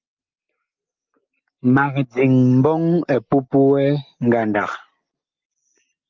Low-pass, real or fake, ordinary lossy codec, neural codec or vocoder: 7.2 kHz; real; Opus, 24 kbps; none